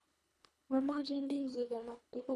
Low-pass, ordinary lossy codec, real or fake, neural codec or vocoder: none; none; fake; codec, 24 kHz, 1.5 kbps, HILCodec